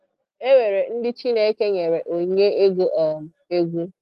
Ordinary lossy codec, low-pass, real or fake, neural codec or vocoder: Opus, 24 kbps; 14.4 kHz; real; none